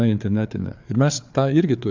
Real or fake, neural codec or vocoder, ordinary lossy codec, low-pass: fake; codec, 16 kHz, 4 kbps, FreqCodec, larger model; MP3, 64 kbps; 7.2 kHz